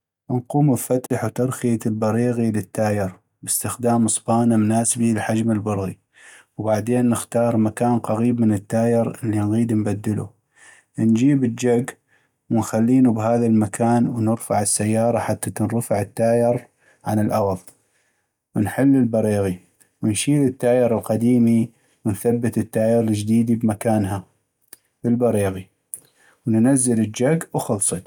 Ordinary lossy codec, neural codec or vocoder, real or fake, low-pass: none; none; real; 19.8 kHz